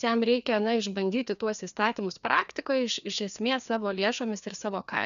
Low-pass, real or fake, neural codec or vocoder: 7.2 kHz; fake; codec, 16 kHz, 2 kbps, FreqCodec, larger model